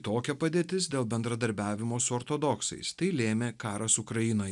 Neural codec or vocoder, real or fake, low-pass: none; real; 10.8 kHz